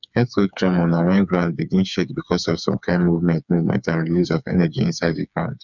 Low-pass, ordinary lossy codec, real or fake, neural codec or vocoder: 7.2 kHz; none; fake; codec, 16 kHz, 4 kbps, FreqCodec, smaller model